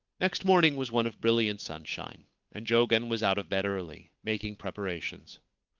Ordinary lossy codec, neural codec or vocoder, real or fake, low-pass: Opus, 24 kbps; codec, 16 kHz, 8 kbps, FunCodec, trained on Chinese and English, 25 frames a second; fake; 7.2 kHz